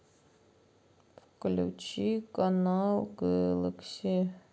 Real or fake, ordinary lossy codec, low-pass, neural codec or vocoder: real; none; none; none